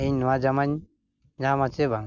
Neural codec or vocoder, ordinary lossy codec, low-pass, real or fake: none; none; 7.2 kHz; real